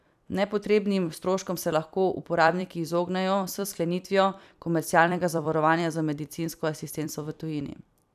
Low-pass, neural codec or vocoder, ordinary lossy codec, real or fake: 14.4 kHz; vocoder, 44.1 kHz, 128 mel bands every 256 samples, BigVGAN v2; none; fake